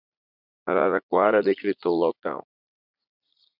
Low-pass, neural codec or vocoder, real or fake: 5.4 kHz; vocoder, 44.1 kHz, 80 mel bands, Vocos; fake